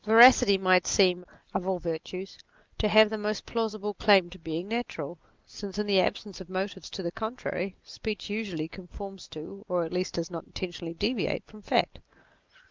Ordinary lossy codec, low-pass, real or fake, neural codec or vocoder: Opus, 24 kbps; 7.2 kHz; real; none